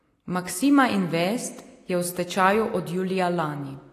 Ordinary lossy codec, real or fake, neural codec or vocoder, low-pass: AAC, 48 kbps; real; none; 14.4 kHz